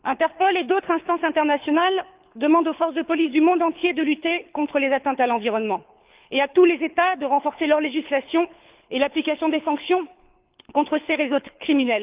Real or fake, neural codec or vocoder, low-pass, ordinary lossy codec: fake; codec, 24 kHz, 6 kbps, HILCodec; 3.6 kHz; Opus, 24 kbps